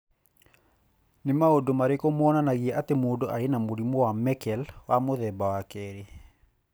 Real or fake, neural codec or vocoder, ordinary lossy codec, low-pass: real; none; none; none